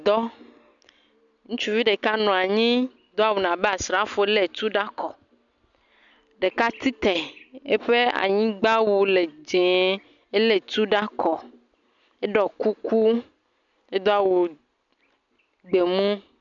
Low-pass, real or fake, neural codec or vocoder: 7.2 kHz; real; none